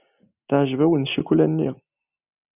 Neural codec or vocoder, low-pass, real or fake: none; 3.6 kHz; real